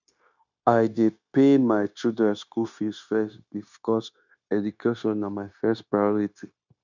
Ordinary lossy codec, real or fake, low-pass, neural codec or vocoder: none; fake; 7.2 kHz; codec, 16 kHz, 0.9 kbps, LongCat-Audio-Codec